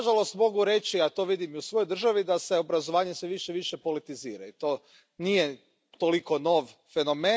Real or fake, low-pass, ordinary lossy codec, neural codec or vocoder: real; none; none; none